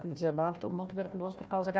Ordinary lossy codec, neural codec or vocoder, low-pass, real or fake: none; codec, 16 kHz, 1 kbps, FunCodec, trained on Chinese and English, 50 frames a second; none; fake